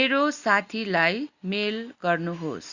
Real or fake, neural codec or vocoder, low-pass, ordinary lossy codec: real; none; 7.2 kHz; Opus, 64 kbps